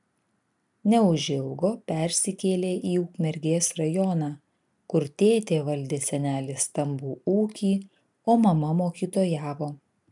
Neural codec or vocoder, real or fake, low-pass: none; real; 10.8 kHz